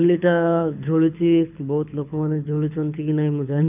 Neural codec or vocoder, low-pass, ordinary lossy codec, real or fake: codec, 24 kHz, 6 kbps, HILCodec; 3.6 kHz; none; fake